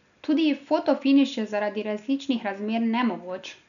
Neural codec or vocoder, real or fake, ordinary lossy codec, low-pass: none; real; none; 7.2 kHz